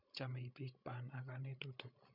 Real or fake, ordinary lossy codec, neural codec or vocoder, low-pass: fake; none; codec, 16 kHz, 16 kbps, FreqCodec, larger model; 5.4 kHz